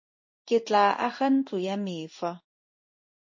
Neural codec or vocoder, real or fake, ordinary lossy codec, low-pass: codec, 16 kHz in and 24 kHz out, 1 kbps, XY-Tokenizer; fake; MP3, 32 kbps; 7.2 kHz